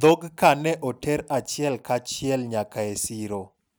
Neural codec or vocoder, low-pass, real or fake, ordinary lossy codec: none; none; real; none